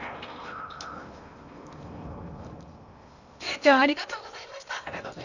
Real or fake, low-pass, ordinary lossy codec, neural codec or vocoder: fake; 7.2 kHz; none; codec, 16 kHz in and 24 kHz out, 0.8 kbps, FocalCodec, streaming, 65536 codes